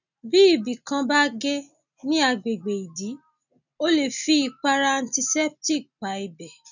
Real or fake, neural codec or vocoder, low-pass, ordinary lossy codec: real; none; 7.2 kHz; none